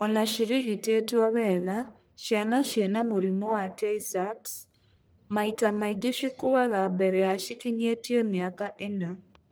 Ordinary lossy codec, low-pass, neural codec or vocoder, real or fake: none; none; codec, 44.1 kHz, 1.7 kbps, Pupu-Codec; fake